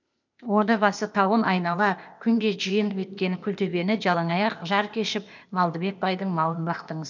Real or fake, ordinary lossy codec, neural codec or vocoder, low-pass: fake; none; codec, 16 kHz, 0.8 kbps, ZipCodec; 7.2 kHz